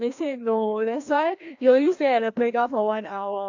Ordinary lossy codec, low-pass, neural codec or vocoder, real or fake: AAC, 48 kbps; 7.2 kHz; codec, 16 kHz, 1 kbps, FreqCodec, larger model; fake